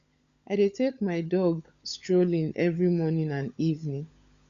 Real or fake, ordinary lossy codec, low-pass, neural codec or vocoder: fake; Opus, 64 kbps; 7.2 kHz; codec, 16 kHz, 16 kbps, FunCodec, trained on LibriTTS, 50 frames a second